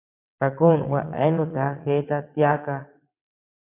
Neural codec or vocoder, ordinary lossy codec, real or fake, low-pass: vocoder, 22.05 kHz, 80 mel bands, WaveNeXt; AAC, 32 kbps; fake; 3.6 kHz